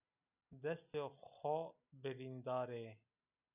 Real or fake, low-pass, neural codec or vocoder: real; 3.6 kHz; none